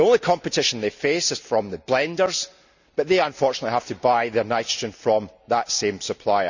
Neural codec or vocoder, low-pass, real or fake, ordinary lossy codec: none; 7.2 kHz; real; none